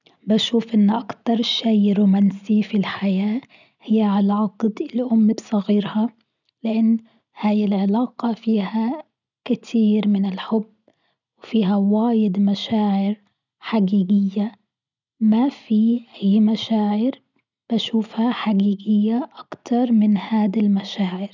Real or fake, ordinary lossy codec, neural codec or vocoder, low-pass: real; none; none; 7.2 kHz